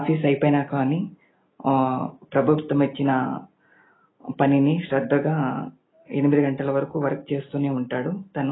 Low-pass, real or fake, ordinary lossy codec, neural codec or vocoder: 7.2 kHz; real; AAC, 16 kbps; none